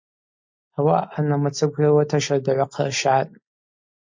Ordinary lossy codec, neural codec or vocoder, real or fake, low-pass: MP3, 48 kbps; none; real; 7.2 kHz